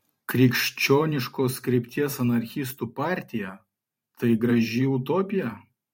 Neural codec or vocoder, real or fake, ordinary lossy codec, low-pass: vocoder, 44.1 kHz, 128 mel bands every 512 samples, BigVGAN v2; fake; MP3, 64 kbps; 19.8 kHz